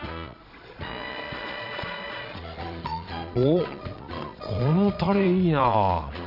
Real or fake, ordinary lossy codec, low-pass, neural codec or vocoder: fake; none; 5.4 kHz; vocoder, 22.05 kHz, 80 mel bands, Vocos